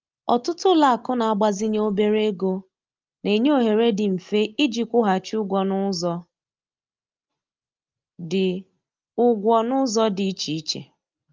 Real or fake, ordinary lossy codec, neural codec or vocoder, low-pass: real; Opus, 24 kbps; none; 7.2 kHz